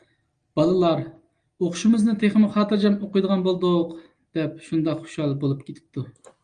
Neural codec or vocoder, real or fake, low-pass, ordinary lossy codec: none; real; 9.9 kHz; Opus, 32 kbps